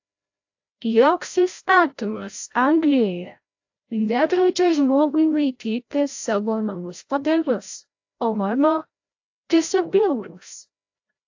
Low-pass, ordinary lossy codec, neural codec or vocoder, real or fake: 7.2 kHz; AAC, 48 kbps; codec, 16 kHz, 0.5 kbps, FreqCodec, larger model; fake